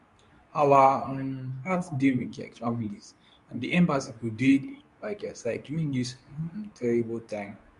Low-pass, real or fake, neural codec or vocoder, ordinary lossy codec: 10.8 kHz; fake; codec, 24 kHz, 0.9 kbps, WavTokenizer, medium speech release version 2; none